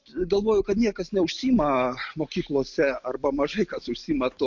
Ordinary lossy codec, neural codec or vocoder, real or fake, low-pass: MP3, 48 kbps; none; real; 7.2 kHz